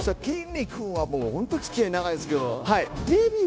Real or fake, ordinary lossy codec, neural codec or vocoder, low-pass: fake; none; codec, 16 kHz, 0.9 kbps, LongCat-Audio-Codec; none